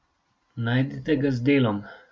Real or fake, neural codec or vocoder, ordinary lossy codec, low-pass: real; none; none; none